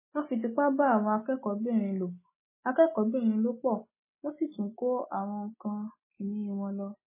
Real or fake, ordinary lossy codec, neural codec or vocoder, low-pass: real; MP3, 16 kbps; none; 3.6 kHz